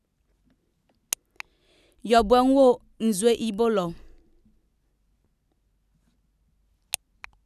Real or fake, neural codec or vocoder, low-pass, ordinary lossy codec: real; none; 14.4 kHz; none